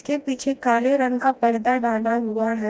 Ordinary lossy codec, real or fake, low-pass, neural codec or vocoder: none; fake; none; codec, 16 kHz, 1 kbps, FreqCodec, smaller model